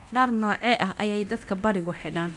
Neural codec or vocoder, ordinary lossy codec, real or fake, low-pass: codec, 24 kHz, 0.9 kbps, DualCodec; none; fake; 10.8 kHz